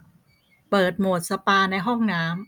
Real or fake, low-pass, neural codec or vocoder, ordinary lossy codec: real; 19.8 kHz; none; none